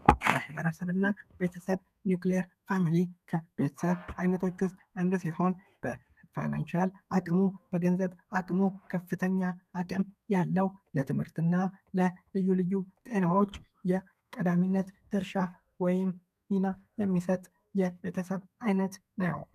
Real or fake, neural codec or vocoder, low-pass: fake; codec, 32 kHz, 1.9 kbps, SNAC; 14.4 kHz